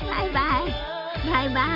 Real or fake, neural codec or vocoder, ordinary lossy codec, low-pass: real; none; none; 5.4 kHz